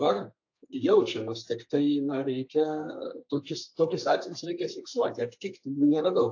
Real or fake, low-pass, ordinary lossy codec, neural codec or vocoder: fake; 7.2 kHz; AAC, 48 kbps; codec, 32 kHz, 1.9 kbps, SNAC